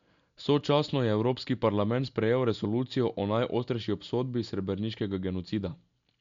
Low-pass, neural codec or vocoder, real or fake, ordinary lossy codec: 7.2 kHz; none; real; MP3, 64 kbps